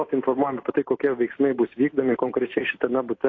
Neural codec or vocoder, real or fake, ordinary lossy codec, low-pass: none; real; AAC, 32 kbps; 7.2 kHz